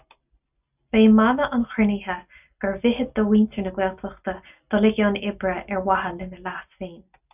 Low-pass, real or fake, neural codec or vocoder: 3.6 kHz; real; none